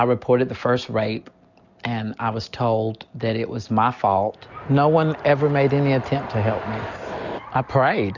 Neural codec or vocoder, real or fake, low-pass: none; real; 7.2 kHz